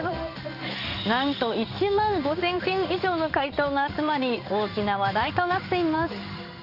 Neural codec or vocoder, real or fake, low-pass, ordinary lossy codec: codec, 16 kHz in and 24 kHz out, 1 kbps, XY-Tokenizer; fake; 5.4 kHz; none